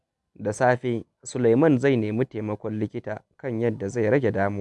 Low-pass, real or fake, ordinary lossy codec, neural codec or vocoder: none; real; none; none